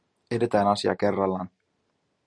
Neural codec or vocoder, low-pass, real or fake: none; 9.9 kHz; real